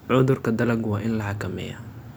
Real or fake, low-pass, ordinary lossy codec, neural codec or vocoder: real; none; none; none